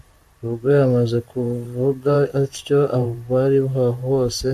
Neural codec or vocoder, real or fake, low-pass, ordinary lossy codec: vocoder, 44.1 kHz, 128 mel bands every 512 samples, BigVGAN v2; fake; 14.4 kHz; AAC, 96 kbps